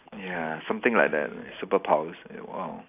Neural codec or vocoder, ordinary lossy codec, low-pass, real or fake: none; none; 3.6 kHz; real